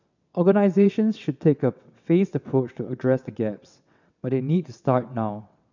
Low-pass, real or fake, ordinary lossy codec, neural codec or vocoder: 7.2 kHz; fake; none; vocoder, 22.05 kHz, 80 mel bands, WaveNeXt